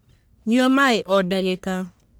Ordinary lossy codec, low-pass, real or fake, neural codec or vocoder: none; none; fake; codec, 44.1 kHz, 1.7 kbps, Pupu-Codec